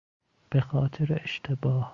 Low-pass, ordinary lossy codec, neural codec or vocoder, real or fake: 7.2 kHz; Opus, 64 kbps; none; real